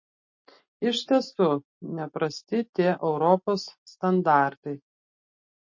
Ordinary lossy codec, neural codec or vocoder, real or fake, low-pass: MP3, 32 kbps; none; real; 7.2 kHz